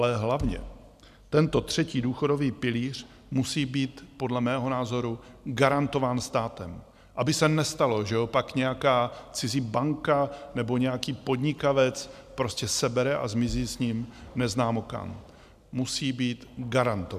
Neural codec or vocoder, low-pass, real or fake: none; 14.4 kHz; real